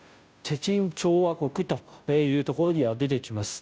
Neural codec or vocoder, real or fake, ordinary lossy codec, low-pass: codec, 16 kHz, 0.5 kbps, FunCodec, trained on Chinese and English, 25 frames a second; fake; none; none